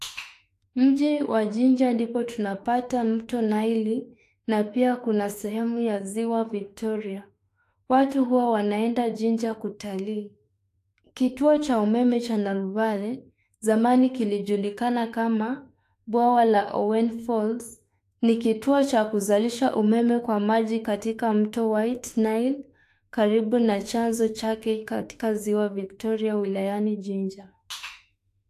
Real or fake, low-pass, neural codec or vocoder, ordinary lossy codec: fake; 14.4 kHz; autoencoder, 48 kHz, 32 numbers a frame, DAC-VAE, trained on Japanese speech; AAC, 64 kbps